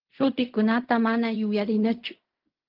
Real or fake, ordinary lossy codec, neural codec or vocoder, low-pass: fake; Opus, 32 kbps; codec, 16 kHz in and 24 kHz out, 0.4 kbps, LongCat-Audio-Codec, fine tuned four codebook decoder; 5.4 kHz